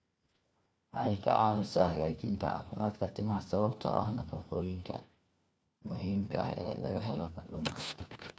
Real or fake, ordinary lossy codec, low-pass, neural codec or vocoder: fake; none; none; codec, 16 kHz, 1 kbps, FunCodec, trained on LibriTTS, 50 frames a second